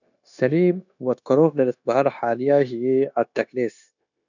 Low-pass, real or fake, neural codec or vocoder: 7.2 kHz; fake; codec, 16 kHz, 0.9 kbps, LongCat-Audio-Codec